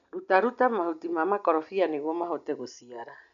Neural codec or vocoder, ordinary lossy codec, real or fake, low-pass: none; none; real; 7.2 kHz